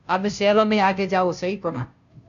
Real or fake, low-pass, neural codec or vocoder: fake; 7.2 kHz; codec, 16 kHz, 0.5 kbps, FunCodec, trained on Chinese and English, 25 frames a second